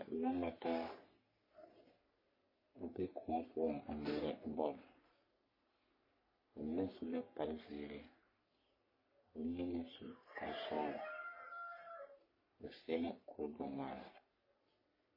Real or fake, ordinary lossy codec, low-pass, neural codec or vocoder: fake; MP3, 24 kbps; 5.4 kHz; codec, 44.1 kHz, 3.4 kbps, Pupu-Codec